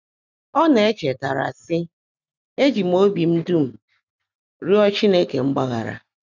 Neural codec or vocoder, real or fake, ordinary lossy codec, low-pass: none; real; none; 7.2 kHz